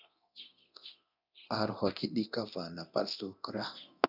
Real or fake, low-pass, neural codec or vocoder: fake; 5.4 kHz; codec, 24 kHz, 0.9 kbps, DualCodec